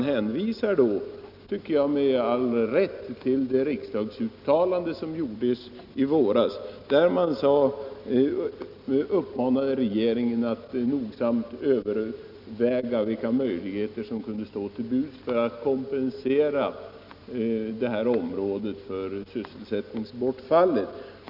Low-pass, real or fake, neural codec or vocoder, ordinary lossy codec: 5.4 kHz; real; none; none